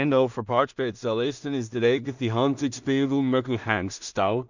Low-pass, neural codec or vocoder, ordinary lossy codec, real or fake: 7.2 kHz; codec, 16 kHz in and 24 kHz out, 0.4 kbps, LongCat-Audio-Codec, two codebook decoder; none; fake